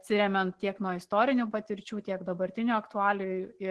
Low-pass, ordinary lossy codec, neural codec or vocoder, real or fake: 10.8 kHz; Opus, 16 kbps; none; real